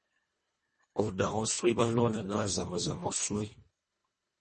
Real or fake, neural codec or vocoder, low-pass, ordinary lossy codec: fake; codec, 24 kHz, 1.5 kbps, HILCodec; 10.8 kHz; MP3, 32 kbps